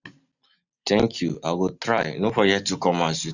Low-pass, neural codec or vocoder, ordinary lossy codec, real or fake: 7.2 kHz; none; none; real